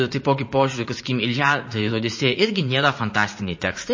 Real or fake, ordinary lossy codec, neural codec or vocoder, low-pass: fake; MP3, 32 kbps; vocoder, 44.1 kHz, 80 mel bands, Vocos; 7.2 kHz